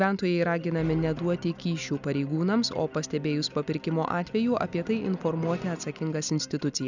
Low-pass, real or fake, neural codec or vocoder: 7.2 kHz; real; none